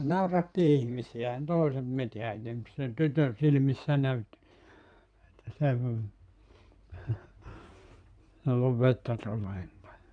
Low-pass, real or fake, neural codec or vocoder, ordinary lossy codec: 9.9 kHz; fake; codec, 16 kHz in and 24 kHz out, 2.2 kbps, FireRedTTS-2 codec; none